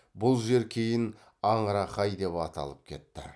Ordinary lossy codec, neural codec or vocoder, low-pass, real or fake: none; none; none; real